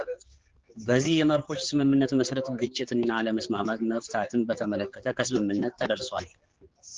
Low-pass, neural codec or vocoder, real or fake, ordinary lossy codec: 7.2 kHz; codec, 16 kHz, 4 kbps, X-Codec, HuBERT features, trained on general audio; fake; Opus, 16 kbps